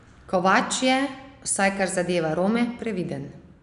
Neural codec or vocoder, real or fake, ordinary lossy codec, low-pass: none; real; none; 10.8 kHz